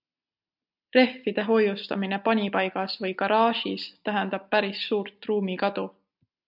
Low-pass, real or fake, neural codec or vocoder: 5.4 kHz; real; none